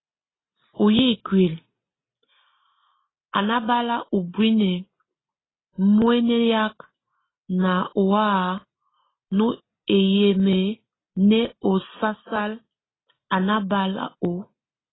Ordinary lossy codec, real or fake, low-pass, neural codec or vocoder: AAC, 16 kbps; real; 7.2 kHz; none